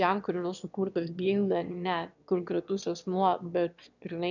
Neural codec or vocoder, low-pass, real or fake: autoencoder, 22.05 kHz, a latent of 192 numbers a frame, VITS, trained on one speaker; 7.2 kHz; fake